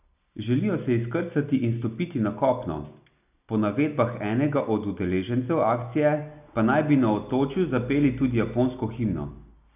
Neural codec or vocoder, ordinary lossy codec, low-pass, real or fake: none; none; 3.6 kHz; real